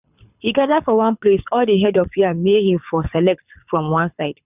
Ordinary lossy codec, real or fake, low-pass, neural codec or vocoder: none; fake; 3.6 kHz; codec, 24 kHz, 6 kbps, HILCodec